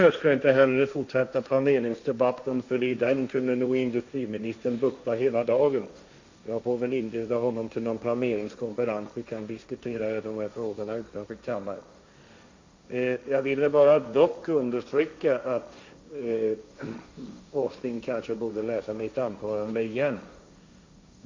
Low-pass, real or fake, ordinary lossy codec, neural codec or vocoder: 7.2 kHz; fake; MP3, 64 kbps; codec, 16 kHz, 1.1 kbps, Voila-Tokenizer